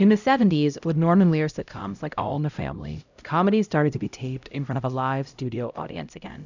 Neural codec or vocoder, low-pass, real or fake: codec, 16 kHz, 0.5 kbps, X-Codec, HuBERT features, trained on LibriSpeech; 7.2 kHz; fake